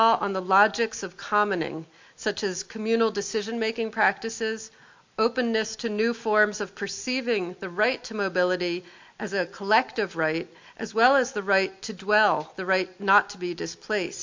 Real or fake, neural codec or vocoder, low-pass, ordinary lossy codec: real; none; 7.2 kHz; MP3, 48 kbps